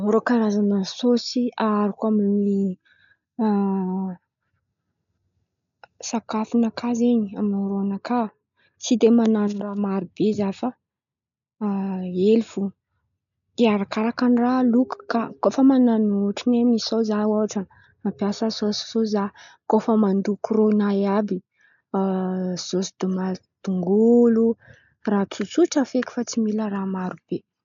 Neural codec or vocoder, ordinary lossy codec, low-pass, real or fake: none; none; 7.2 kHz; real